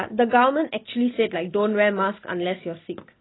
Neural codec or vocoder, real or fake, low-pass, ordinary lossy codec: none; real; 7.2 kHz; AAC, 16 kbps